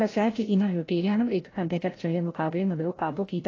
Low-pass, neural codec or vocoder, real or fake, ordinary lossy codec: 7.2 kHz; codec, 16 kHz, 0.5 kbps, FreqCodec, larger model; fake; AAC, 32 kbps